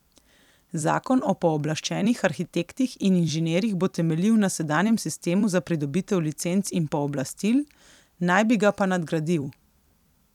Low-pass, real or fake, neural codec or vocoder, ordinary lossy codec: 19.8 kHz; fake; vocoder, 44.1 kHz, 128 mel bands every 256 samples, BigVGAN v2; none